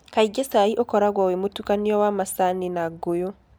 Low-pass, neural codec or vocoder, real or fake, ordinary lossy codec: none; none; real; none